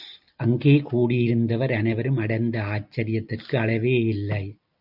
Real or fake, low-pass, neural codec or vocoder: real; 5.4 kHz; none